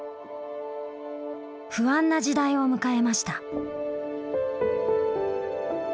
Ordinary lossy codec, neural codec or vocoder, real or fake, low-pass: none; none; real; none